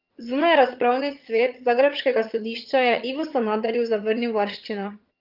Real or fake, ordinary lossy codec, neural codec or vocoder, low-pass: fake; Opus, 24 kbps; vocoder, 22.05 kHz, 80 mel bands, HiFi-GAN; 5.4 kHz